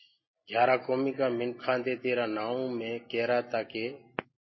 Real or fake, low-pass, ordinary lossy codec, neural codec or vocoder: real; 7.2 kHz; MP3, 24 kbps; none